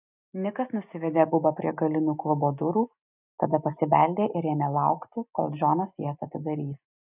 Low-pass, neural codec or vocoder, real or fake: 3.6 kHz; none; real